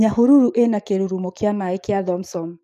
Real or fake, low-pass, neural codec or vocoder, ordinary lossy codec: fake; 14.4 kHz; codec, 44.1 kHz, 7.8 kbps, DAC; none